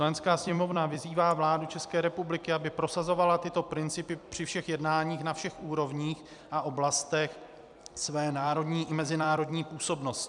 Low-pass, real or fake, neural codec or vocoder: 10.8 kHz; fake; vocoder, 44.1 kHz, 128 mel bands every 256 samples, BigVGAN v2